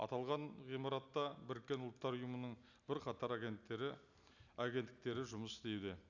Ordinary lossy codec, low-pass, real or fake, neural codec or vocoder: none; 7.2 kHz; real; none